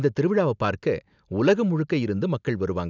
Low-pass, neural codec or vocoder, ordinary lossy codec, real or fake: 7.2 kHz; none; none; real